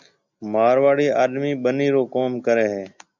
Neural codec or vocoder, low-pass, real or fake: none; 7.2 kHz; real